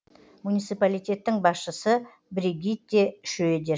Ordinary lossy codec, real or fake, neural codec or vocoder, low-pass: none; real; none; none